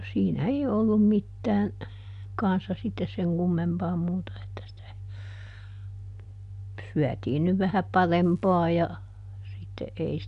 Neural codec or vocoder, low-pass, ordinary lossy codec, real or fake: none; 10.8 kHz; none; real